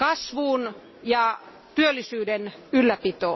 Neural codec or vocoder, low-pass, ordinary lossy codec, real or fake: none; 7.2 kHz; MP3, 24 kbps; real